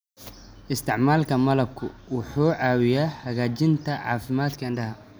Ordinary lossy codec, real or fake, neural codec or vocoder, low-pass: none; real; none; none